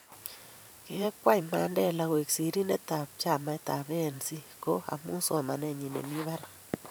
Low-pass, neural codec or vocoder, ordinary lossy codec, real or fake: none; vocoder, 44.1 kHz, 128 mel bands every 512 samples, BigVGAN v2; none; fake